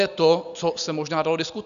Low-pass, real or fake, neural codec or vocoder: 7.2 kHz; real; none